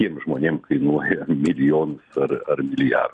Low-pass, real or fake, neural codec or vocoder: 9.9 kHz; real; none